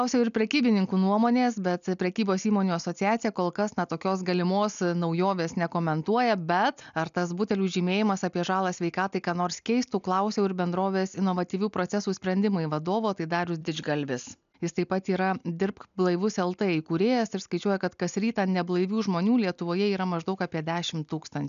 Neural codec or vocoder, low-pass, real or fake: none; 7.2 kHz; real